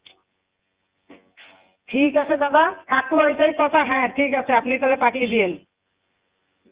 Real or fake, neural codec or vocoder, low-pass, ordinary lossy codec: fake; vocoder, 24 kHz, 100 mel bands, Vocos; 3.6 kHz; Opus, 24 kbps